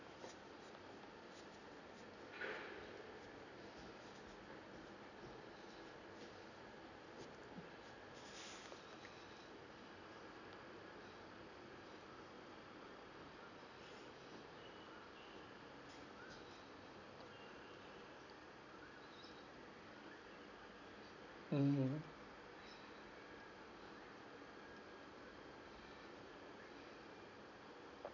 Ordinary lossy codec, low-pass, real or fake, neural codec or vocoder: none; 7.2 kHz; real; none